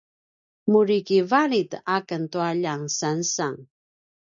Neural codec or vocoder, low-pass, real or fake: none; 7.2 kHz; real